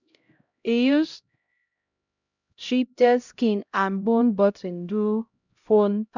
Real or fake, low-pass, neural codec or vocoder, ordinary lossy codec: fake; 7.2 kHz; codec, 16 kHz, 0.5 kbps, X-Codec, HuBERT features, trained on LibriSpeech; none